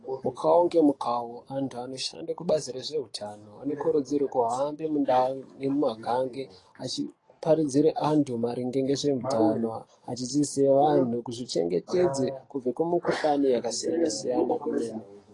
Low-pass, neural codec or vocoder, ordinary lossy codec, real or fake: 10.8 kHz; codec, 44.1 kHz, 7.8 kbps, DAC; AAC, 32 kbps; fake